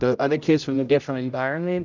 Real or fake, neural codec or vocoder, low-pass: fake; codec, 16 kHz, 0.5 kbps, X-Codec, HuBERT features, trained on general audio; 7.2 kHz